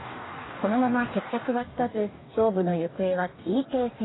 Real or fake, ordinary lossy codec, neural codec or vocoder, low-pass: fake; AAC, 16 kbps; codec, 44.1 kHz, 2.6 kbps, DAC; 7.2 kHz